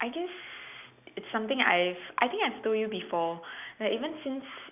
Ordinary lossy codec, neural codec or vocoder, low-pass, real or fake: none; none; 3.6 kHz; real